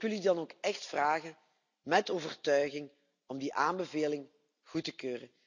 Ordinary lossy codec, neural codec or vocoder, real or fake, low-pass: none; none; real; 7.2 kHz